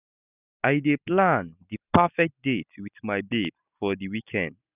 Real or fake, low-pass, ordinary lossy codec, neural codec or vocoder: real; 3.6 kHz; none; none